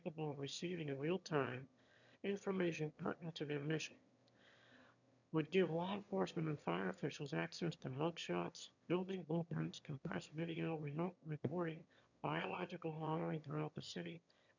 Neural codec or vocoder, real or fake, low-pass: autoencoder, 22.05 kHz, a latent of 192 numbers a frame, VITS, trained on one speaker; fake; 7.2 kHz